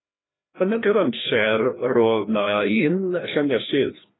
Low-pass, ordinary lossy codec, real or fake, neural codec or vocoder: 7.2 kHz; AAC, 16 kbps; fake; codec, 16 kHz, 1 kbps, FreqCodec, larger model